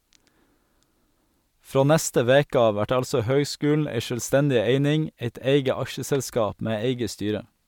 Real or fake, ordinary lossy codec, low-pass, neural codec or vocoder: real; MP3, 96 kbps; 19.8 kHz; none